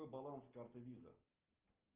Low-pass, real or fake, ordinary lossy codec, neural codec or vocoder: 3.6 kHz; real; Opus, 32 kbps; none